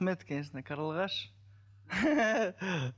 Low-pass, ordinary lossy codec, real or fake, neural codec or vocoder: none; none; real; none